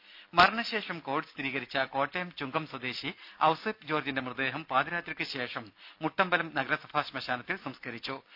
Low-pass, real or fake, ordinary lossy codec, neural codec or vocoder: 5.4 kHz; real; none; none